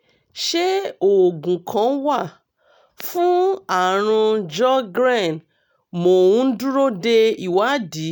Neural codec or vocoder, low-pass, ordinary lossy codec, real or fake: none; none; none; real